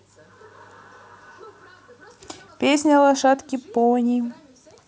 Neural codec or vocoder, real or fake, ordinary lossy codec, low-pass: none; real; none; none